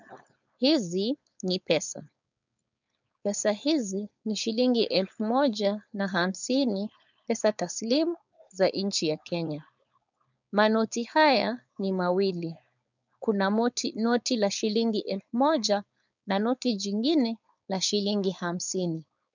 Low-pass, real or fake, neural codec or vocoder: 7.2 kHz; fake; codec, 16 kHz, 4.8 kbps, FACodec